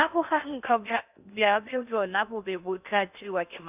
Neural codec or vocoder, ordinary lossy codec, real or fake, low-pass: codec, 16 kHz in and 24 kHz out, 0.6 kbps, FocalCodec, streaming, 4096 codes; none; fake; 3.6 kHz